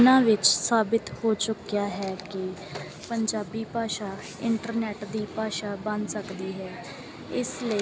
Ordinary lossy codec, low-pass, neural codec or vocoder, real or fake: none; none; none; real